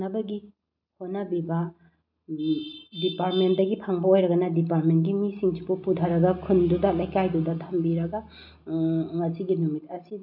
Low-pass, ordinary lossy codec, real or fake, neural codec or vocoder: 5.4 kHz; none; real; none